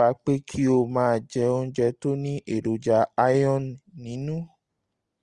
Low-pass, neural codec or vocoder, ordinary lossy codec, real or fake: 10.8 kHz; none; Opus, 32 kbps; real